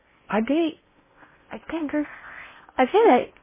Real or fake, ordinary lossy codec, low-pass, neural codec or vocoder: fake; MP3, 16 kbps; 3.6 kHz; codec, 16 kHz in and 24 kHz out, 0.6 kbps, FocalCodec, streaming, 4096 codes